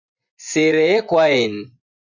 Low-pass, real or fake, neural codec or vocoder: 7.2 kHz; fake; vocoder, 24 kHz, 100 mel bands, Vocos